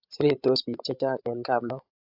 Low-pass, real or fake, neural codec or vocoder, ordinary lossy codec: 5.4 kHz; fake; codec, 16 kHz, 8 kbps, FreqCodec, larger model; AAC, 48 kbps